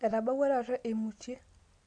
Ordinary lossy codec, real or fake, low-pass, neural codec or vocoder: MP3, 64 kbps; real; 9.9 kHz; none